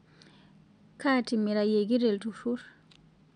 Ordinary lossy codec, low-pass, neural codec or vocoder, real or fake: none; 9.9 kHz; none; real